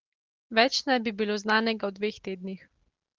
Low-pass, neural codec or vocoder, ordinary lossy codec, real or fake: 7.2 kHz; none; Opus, 16 kbps; real